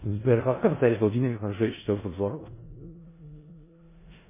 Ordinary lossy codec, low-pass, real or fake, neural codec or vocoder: MP3, 16 kbps; 3.6 kHz; fake; codec, 16 kHz in and 24 kHz out, 0.4 kbps, LongCat-Audio-Codec, four codebook decoder